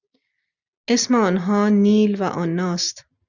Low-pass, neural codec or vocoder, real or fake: 7.2 kHz; none; real